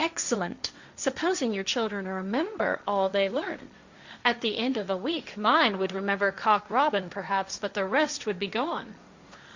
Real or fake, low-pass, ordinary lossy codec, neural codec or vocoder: fake; 7.2 kHz; Opus, 64 kbps; codec, 16 kHz, 1.1 kbps, Voila-Tokenizer